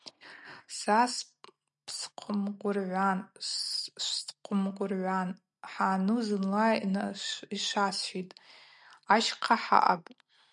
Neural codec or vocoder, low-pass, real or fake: none; 10.8 kHz; real